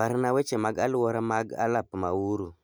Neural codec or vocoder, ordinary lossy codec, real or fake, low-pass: none; none; real; none